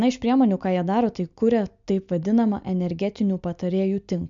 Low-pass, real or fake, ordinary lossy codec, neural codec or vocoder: 7.2 kHz; real; MP3, 64 kbps; none